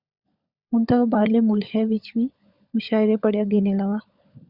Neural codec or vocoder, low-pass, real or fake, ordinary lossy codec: codec, 16 kHz, 16 kbps, FunCodec, trained on LibriTTS, 50 frames a second; 5.4 kHz; fake; Opus, 64 kbps